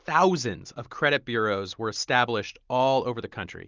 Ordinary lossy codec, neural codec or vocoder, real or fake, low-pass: Opus, 32 kbps; none; real; 7.2 kHz